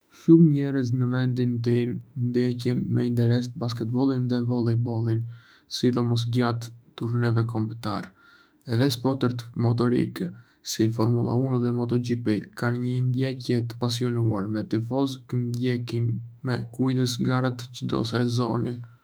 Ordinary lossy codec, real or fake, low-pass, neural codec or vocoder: none; fake; none; autoencoder, 48 kHz, 32 numbers a frame, DAC-VAE, trained on Japanese speech